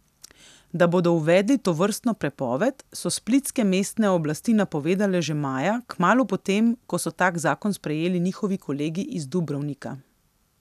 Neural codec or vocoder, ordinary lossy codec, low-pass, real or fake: none; none; 14.4 kHz; real